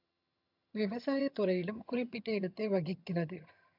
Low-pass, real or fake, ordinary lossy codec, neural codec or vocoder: 5.4 kHz; fake; AAC, 48 kbps; vocoder, 22.05 kHz, 80 mel bands, HiFi-GAN